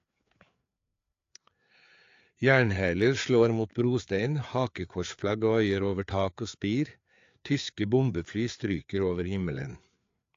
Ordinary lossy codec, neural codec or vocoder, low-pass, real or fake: AAC, 48 kbps; codec, 16 kHz, 4 kbps, FreqCodec, larger model; 7.2 kHz; fake